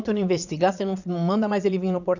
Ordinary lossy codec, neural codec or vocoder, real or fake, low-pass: none; vocoder, 22.05 kHz, 80 mel bands, Vocos; fake; 7.2 kHz